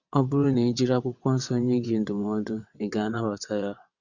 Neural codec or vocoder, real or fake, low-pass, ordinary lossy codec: vocoder, 22.05 kHz, 80 mel bands, WaveNeXt; fake; 7.2 kHz; Opus, 64 kbps